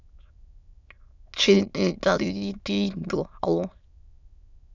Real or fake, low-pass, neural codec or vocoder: fake; 7.2 kHz; autoencoder, 22.05 kHz, a latent of 192 numbers a frame, VITS, trained on many speakers